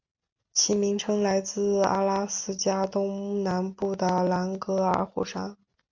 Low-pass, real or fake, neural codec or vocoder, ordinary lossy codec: 7.2 kHz; real; none; MP3, 48 kbps